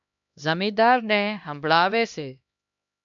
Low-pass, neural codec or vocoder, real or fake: 7.2 kHz; codec, 16 kHz, 1 kbps, X-Codec, HuBERT features, trained on LibriSpeech; fake